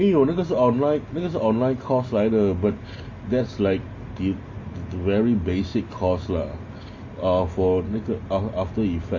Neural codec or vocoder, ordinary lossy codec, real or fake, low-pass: none; MP3, 32 kbps; real; 7.2 kHz